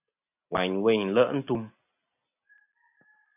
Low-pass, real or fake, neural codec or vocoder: 3.6 kHz; real; none